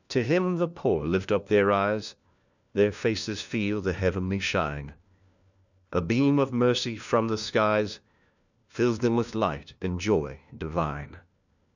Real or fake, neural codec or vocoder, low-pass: fake; codec, 16 kHz, 1 kbps, FunCodec, trained on LibriTTS, 50 frames a second; 7.2 kHz